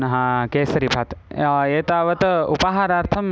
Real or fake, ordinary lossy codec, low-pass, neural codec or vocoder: real; none; none; none